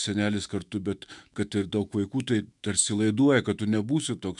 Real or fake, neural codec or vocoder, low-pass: fake; autoencoder, 48 kHz, 128 numbers a frame, DAC-VAE, trained on Japanese speech; 10.8 kHz